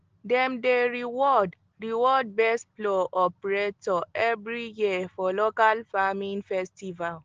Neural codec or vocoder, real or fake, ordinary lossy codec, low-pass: none; real; Opus, 16 kbps; 7.2 kHz